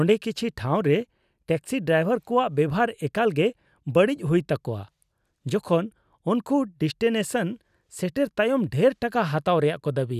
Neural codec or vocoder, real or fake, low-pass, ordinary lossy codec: none; real; 14.4 kHz; none